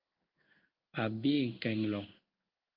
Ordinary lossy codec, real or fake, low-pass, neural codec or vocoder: Opus, 24 kbps; fake; 5.4 kHz; codec, 16 kHz in and 24 kHz out, 1 kbps, XY-Tokenizer